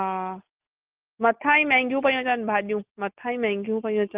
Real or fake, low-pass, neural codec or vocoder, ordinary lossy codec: real; 3.6 kHz; none; Opus, 24 kbps